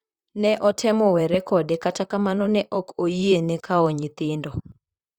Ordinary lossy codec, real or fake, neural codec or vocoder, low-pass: Opus, 64 kbps; fake; vocoder, 44.1 kHz, 128 mel bands, Pupu-Vocoder; 19.8 kHz